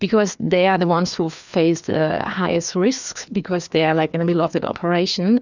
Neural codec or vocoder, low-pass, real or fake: codec, 16 kHz, 2 kbps, FreqCodec, larger model; 7.2 kHz; fake